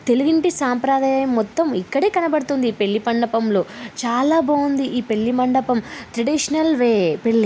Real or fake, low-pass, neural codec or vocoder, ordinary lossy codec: real; none; none; none